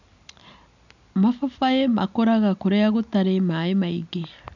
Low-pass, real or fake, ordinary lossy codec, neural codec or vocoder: 7.2 kHz; real; none; none